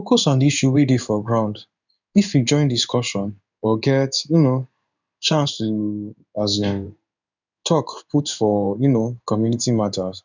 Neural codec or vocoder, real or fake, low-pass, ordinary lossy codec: codec, 16 kHz in and 24 kHz out, 1 kbps, XY-Tokenizer; fake; 7.2 kHz; none